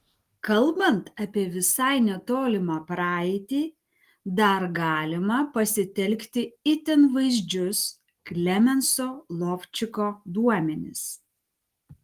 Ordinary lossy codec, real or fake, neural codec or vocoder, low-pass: Opus, 24 kbps; real; none; 14.4 kHz